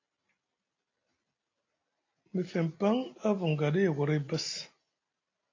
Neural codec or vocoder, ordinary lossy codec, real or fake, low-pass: none; AAC, 32 kbps; real; 7.2 kHz